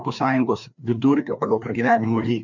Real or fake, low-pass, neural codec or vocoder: fake; 7.2 kHz; codec, 16 kHz, 2 kbps, FreqCodec, larger model